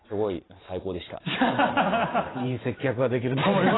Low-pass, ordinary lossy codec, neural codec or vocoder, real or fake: 7.2 kHz; AAC, 16 kbps; vocoder, 44.1 kHz, 128 mel bands every 512 samples, BigVGAN v2; fake